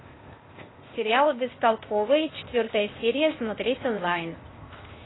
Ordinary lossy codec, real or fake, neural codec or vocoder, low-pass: AAC, 16 kbps; fake; codec, 16 kHz, 0.8 kbps, ZipCodec; 7.2 kHz